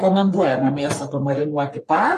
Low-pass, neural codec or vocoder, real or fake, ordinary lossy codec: 14.4 kHz; codec, 44.1 kHz, 3.4 kbps, Pupu-Codec; fake; AAC, 64 kbps